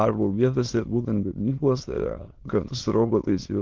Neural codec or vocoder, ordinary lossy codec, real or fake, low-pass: autoencoder, 22.05 kHz, a latent of 192 numbers a frame, VITS, trained on many speakers; Opus, 16 kbps; fake; 7.2 kHz